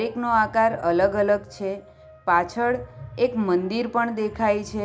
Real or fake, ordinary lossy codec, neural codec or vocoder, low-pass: real; none; none; none